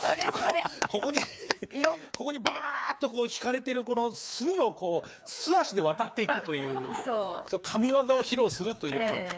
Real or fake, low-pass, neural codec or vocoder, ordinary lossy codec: fake; none; codec, 16 kHz, 2 kbps, FreqCodec, larger model; none